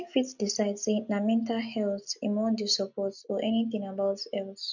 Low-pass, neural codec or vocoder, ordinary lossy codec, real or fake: 7.2 kHz; none; none; real